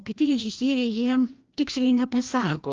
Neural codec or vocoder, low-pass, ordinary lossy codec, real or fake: codec, 16 kHz, 1 kbps, FreqCodec, larger model; 7.2 kHz; Opus, 32 kbps; fake